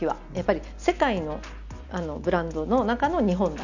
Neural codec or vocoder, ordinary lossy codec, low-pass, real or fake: none; none; 7.2 kHz; real